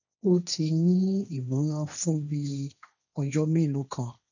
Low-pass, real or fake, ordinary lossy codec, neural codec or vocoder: 7.2 kHz; fake; none; codec, 16 kHz, 1.1 kbps, Voila-Tokenizer